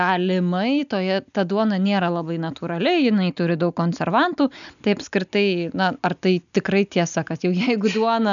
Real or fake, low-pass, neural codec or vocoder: real; 7.2 kHz; none